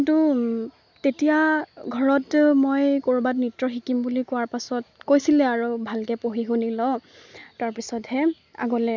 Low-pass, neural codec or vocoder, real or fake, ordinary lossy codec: 7.2 kHz; none; real; none